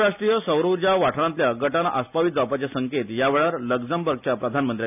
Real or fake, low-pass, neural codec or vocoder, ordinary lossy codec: real; 3.6 kHz; none; none